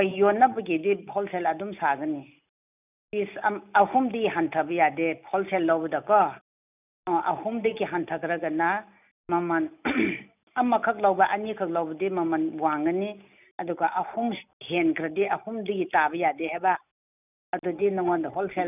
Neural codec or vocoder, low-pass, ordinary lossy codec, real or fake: none; 3.6 kHz; none; real